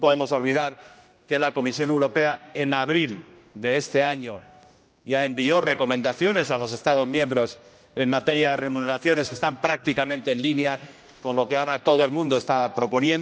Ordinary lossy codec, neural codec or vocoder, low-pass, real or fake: none; codec, 16 kHz, 1 kbps, X-Codec, HuBERT features, trained on general audio; none; fake